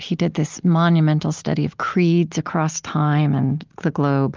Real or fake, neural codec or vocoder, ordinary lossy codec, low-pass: real; none; Opus, 24 kbps; 7.2 kHz